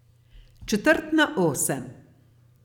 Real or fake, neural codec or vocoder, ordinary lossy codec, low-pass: fake; vocoder, 44.1 kHz, 128 mel bands every 256 samples, BigVGAN v2; none; 19.8 kHz